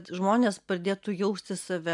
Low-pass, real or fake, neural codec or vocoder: 10.8 kHz; real; none